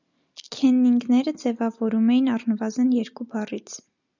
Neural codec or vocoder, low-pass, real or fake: none; 7.2 kHz; real